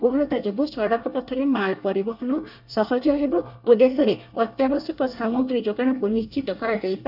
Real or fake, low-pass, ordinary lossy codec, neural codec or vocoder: fake; 5.4 kHz; none; codec, 24 kHz, 1 kbps, SNAC